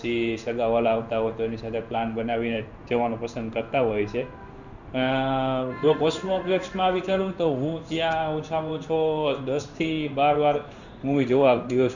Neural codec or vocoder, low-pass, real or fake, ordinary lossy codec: codec, 16 kHz in and 24 kHz out, 1 kbps, XY-Tokenizer; 7.2 kHz; fake; none